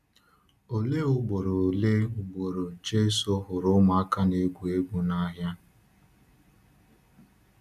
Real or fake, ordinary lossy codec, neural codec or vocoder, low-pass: real; none; none; 14.4 kHz